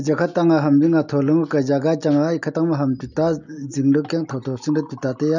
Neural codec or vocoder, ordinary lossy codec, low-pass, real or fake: none; none; 7.2 kHz; real